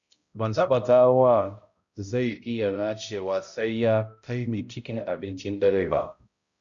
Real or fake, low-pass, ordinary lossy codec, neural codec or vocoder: fake; 7.2 kHz; Opus, 64 kbps; codec, 16 kHz, 0.5 kbps, X-Codec, HuBERT features, trained on balanced general audio